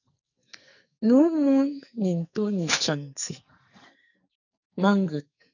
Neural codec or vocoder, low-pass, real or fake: codec, 44.1 kHz, 2.6 kbps, SNAC; 7.2 kHz; fake